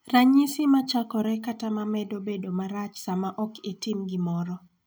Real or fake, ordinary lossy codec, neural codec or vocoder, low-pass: real; none; none; none